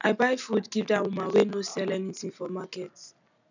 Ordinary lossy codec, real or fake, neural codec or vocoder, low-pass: none; real; none; 7.2 kHz